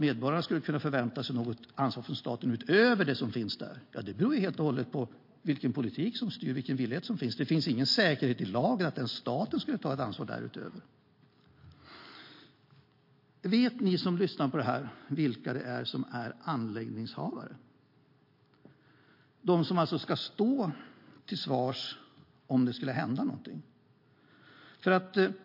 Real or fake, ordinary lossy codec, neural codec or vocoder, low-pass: real; MP3, 32 kbps; none; 5.4 kHz